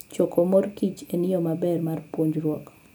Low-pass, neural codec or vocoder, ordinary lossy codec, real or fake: none; none; none; real